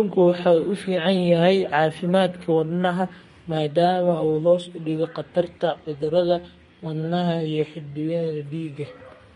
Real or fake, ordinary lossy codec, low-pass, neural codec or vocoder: fake; MP3, 48 kbps; 14.4 kHz; codec, 32 kHz, 1.9 kbps, SNAC